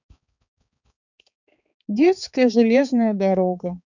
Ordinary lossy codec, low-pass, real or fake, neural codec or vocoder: none; 7.2 kHz; fake; codec, 16 kHz, 4 kbps, X-Codec, HuBERT features, trained on balanced general audio